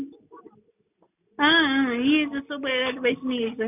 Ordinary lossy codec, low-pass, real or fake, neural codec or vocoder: none; 3.6 kHz; real; none